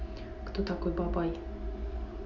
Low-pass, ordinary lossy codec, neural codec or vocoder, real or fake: 7.2 kHz; Opus, 64 kbps; none; real